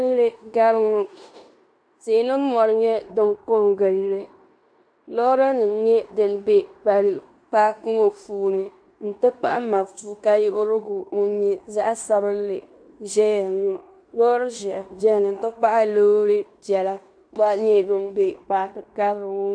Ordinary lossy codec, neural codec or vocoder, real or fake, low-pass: AAC, 64 kbps; codec, 16 kHz in and 24 kHz out, 0.9 kbps, LongCat-Audio-Codec, fine tuned four codebook decoder; fake; 9.9 kHz